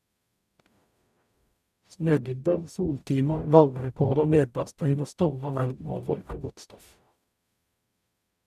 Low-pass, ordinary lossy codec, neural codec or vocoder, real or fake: 14.4 kHz; none; codec, 44.1 kHz, 0.9 kbps, DAC; fake